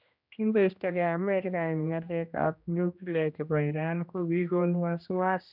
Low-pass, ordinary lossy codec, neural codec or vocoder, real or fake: 5.4 kHz; none; codec, 16 kHz, 1 kbps, X-Codec, HuBERT features, trained on general audio; fake